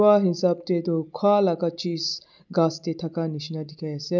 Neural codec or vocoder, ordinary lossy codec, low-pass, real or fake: none; none; 7.2 kHz; real